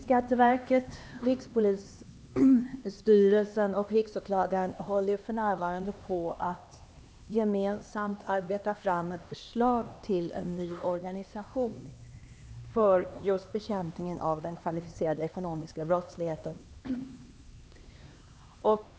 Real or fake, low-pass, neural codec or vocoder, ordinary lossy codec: fake; none; codec, 16 kHz, 2 kbps, X-Codec, HuBERT features, trained on LibriSpeech; none